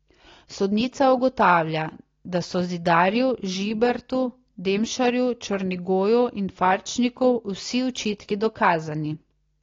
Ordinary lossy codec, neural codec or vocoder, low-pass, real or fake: AAC, 32 kbps; none; 7.2 kHz; real